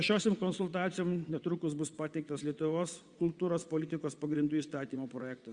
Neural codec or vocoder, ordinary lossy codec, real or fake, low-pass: vocoder, 22.05 kHz, 80 mel bands, WaveNeXt; Opus, 64 kbps; fake; 9.9 kHz